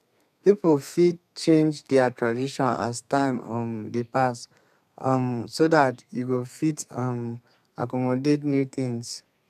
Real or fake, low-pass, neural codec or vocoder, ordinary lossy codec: fake; 14.4 kHz; codec, 32 kHz, 1.9 kbps, SNAC; none